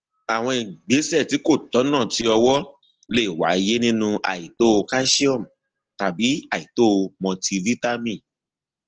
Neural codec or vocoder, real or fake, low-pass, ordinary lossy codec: none; real; 7.2 kHz; Opus, 16 kbps